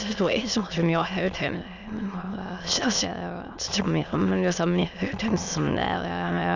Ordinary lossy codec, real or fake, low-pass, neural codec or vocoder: none; fake; 7.2 kHz; autoencoder, 22.05 kHz, a latent of 192 numbers a frame, VITS, trained on many speakers